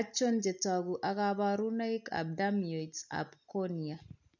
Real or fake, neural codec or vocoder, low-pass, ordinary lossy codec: real; none; 7.2 kHz; none